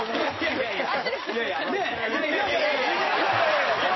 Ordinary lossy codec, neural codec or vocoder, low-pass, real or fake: MP3, 24 kbps; none; 7.2 kHz; real